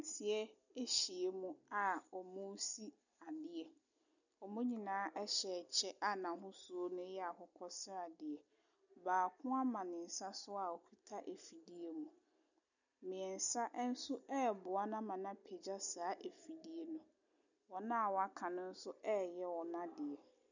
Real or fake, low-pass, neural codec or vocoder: real; 7.2 kHz; none